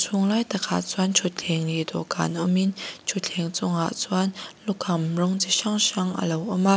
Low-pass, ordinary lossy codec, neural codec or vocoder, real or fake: none; none; none; real